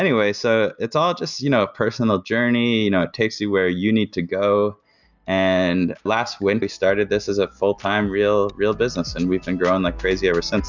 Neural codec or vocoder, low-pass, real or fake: none; 7.2 kHz; real